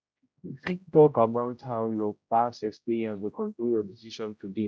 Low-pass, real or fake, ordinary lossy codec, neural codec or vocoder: none; fake; none; codec, 16 kHz, 0.5 kbps, X-Codec, HuBERT features, trained on general audio